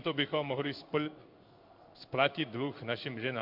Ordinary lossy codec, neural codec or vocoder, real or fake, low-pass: MP3, 48 kbps; codec, 16 kHz in and 24 kHz out, 1 kbps, XY-Tokenizer; fake; 5.4 kHz